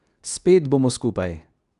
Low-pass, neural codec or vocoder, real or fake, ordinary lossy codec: 10.8 kHz; codec, 24 kHz, 0.9 kbps, WavTokenizer, medium speech release version 2; fake; none